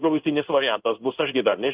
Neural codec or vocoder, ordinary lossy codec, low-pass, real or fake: codec, 16 kHz in and 24 kHz out, 1 kbps, XY-Tokenizer; Opus, 24 kbps; 3.6 kHz; fake